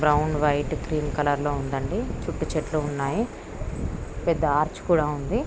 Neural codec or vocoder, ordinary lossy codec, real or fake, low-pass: none; none; real; none